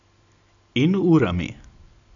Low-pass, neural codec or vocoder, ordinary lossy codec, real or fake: 7.2 kHz; none; none; real